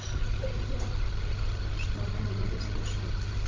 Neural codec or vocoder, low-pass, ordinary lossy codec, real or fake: codec, 16 kHz, 16 kbps, FreqCodec, larger model; 7.2 kHz; Opus, 24 kbps; fake